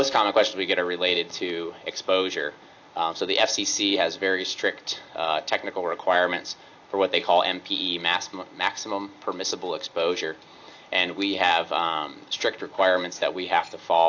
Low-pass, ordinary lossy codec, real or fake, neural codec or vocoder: 7.2 kHz; AAC, 48 kbps; real; none